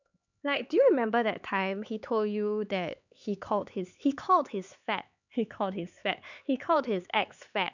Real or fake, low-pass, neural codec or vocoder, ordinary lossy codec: fake; 7.2 kHz; codec, 16 kHz, 4 kbps, X-Codec, HuBERT features, trained on LibriSpeech; none